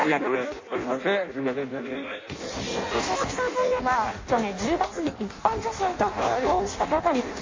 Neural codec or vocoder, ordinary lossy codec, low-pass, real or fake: codec, 16 kHz in and 24 kHz out, 0.6 kbps, FireRedTTS-2 codec; MP3, 32 kbps; 7.2 kHz; fake